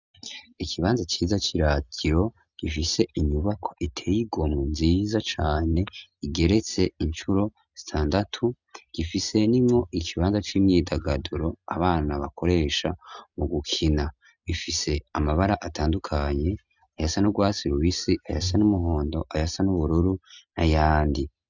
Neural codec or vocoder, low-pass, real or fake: none; 7.2 kHz; real